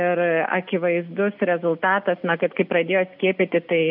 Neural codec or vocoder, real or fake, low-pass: none; real; 5.4 kHz